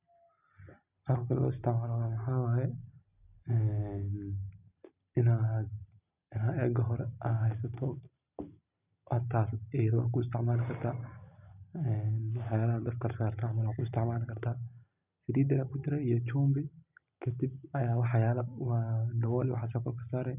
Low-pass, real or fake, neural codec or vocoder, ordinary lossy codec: 3.6 kHz; real; none; none